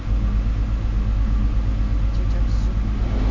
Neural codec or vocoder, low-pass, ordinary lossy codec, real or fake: none; 7.2 kHz; AAC, 48 kbps; real